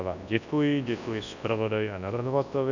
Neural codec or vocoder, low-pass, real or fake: codec, 24 kHz, 0.9 kbps, WavTokenizer, large speech release; 7.2 kHz; fake